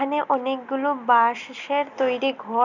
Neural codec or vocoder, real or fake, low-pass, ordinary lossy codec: none; real; 7.2 kHz; none